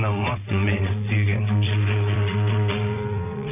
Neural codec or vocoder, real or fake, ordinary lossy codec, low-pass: vocoder, 22.05 kHz, 80 mel bands, WaveNeXt; fake; none; 3.6 kHz